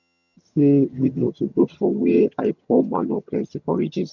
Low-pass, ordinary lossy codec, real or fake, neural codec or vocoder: 7.2 kHz; none; fake; vocoder, 22.05 kHz, 80 mel bands, HiFi-GAN